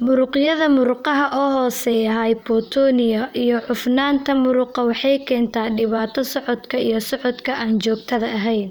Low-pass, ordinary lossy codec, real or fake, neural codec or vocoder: none; none; fake; vocoder, 44.1 kHz, 128 mel bands, Pupu-Vocoder